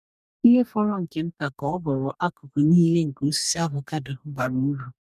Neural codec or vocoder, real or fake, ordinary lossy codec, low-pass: codec, 44.1 kHz, 2.6 kbps, DAC; fake; none; 14.4 kHz